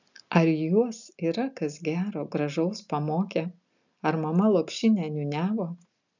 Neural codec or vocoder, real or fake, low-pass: none; real; 7.2 kHz